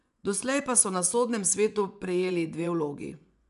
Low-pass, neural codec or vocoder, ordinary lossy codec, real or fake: 10.8 kHz; vocoder, 24 kHz, 100 mel bands, Vocos; none; fake